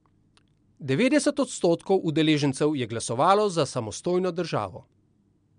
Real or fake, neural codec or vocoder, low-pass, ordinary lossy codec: real; none; 9.9 kHz; MP3, 64 kbps